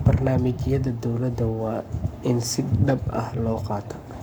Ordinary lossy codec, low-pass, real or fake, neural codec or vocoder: none; none; fake; codec, 44.1 kHz, 7.8 kbps, Pupu-Codec